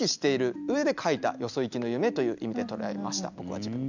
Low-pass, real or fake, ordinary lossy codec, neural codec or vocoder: 7.2 kHz; real; none; none